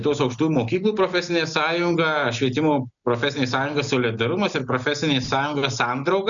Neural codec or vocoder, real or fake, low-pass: none; real; 7.2 kHz